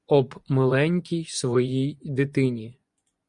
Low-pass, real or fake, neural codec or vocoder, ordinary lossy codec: 10.8 kHz; fake; vocoder, 24 kHz, 100 mel bands, Vocos; Opus, 64 kbps